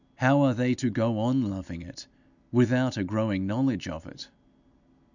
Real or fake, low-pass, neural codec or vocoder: real; 7.2 kHz; none